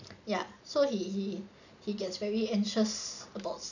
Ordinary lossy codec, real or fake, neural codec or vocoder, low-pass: none; real; none; 7.2 kHz